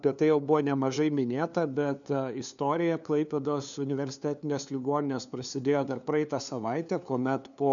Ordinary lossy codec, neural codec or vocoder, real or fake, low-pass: MP3, 96 kbps; codec, 16 kHz, 2 kbps, FunCodec, trained on LibriTTS, 25 frames a second; fake; 7.2 kHz